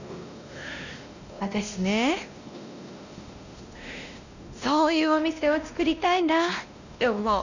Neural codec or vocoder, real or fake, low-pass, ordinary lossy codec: codec, 16 kHz, 1 kbps, X-Codec, WavLM features, trained on Multilingual LibriSpeech; fake; 7.2 kHz; none